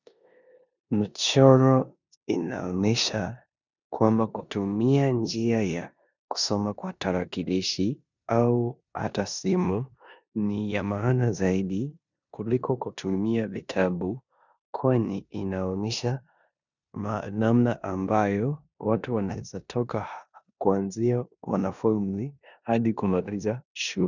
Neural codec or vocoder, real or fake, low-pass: codec, 16 kHz in and 24 kHz out, 0.9 kbps, LongCat-Audio-Codec, four codebook decoder; fake; 7.2 kHz